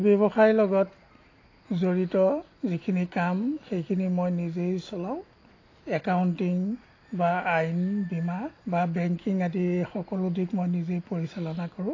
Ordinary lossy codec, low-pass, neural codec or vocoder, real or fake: AAC, 32 kbps; 7.2 kHz; none; real